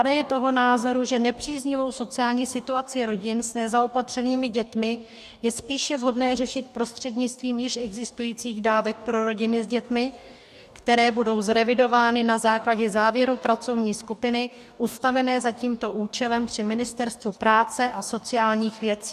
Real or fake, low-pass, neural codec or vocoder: fake; 14.4 kHz; codec, 44.1 kHz, 2.6 kbps, DAC